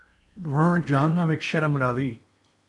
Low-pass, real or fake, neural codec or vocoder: 10.8 kHz; fake; codec, 16 kHz in and 24 kHz out, 0.8 kbps, FocalCodec, streaming, 65536 codes